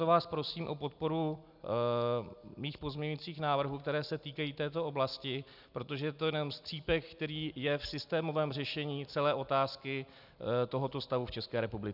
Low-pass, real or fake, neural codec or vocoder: 5.4 kHz; real; none